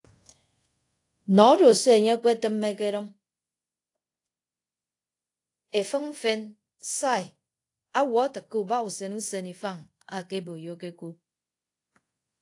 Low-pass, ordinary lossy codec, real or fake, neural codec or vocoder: 10.8 kHz; AAC, 48 kbps; fake; codec, 24 kHz, 0.5 kbps, DualCodec